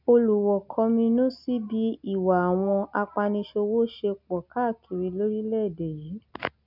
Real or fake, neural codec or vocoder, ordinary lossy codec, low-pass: real; none; none; 5.4 kHz